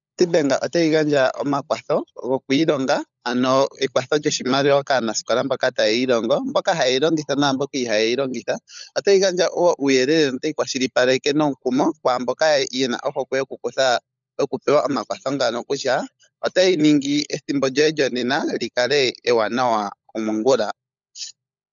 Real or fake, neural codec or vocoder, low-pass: fake; codec, 16 kHz, 16 kbps, FunCodec, trained on LibriTTS, 50 frames a second; 7.2 kHz